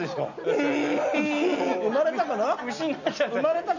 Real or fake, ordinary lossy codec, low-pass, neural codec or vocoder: real; none; 7.2 kHz; none